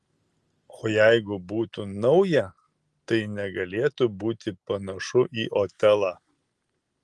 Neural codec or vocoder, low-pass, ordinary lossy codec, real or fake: none; 10.8 kHz; Opus, 24 kbps; real